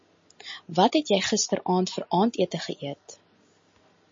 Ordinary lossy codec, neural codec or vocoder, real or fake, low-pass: MP3, 32 kbps; none; real; 7.2 kHz